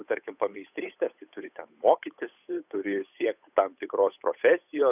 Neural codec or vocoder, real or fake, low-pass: codec, 16 kHz, 8 kbps, FunCodec, trained on Chinese and English, 25 frames a second; fake; 3.6 kHz